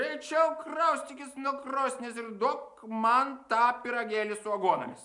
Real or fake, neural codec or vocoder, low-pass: real; none; 10.8 kHz